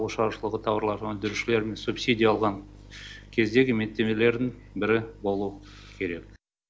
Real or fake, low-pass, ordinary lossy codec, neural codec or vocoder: real; none; none; none